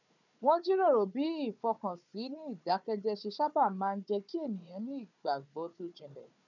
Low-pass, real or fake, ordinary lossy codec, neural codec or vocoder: 7.2 kHz; fake; none; codec, 16 kHz, 4 kbps, FunCodec, trained on Chinese and English, 50 frames a second